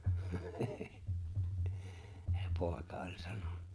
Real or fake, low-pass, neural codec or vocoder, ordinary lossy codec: fake; none; vocoder, 22.05 kHz, 80 mel bands, Vocos; none